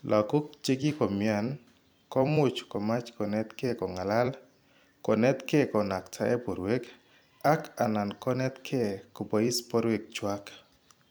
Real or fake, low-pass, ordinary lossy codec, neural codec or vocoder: fake; none; none; vocoder, 44.1 kHz, 128 mel bands every 256 samples, BigVGAN v2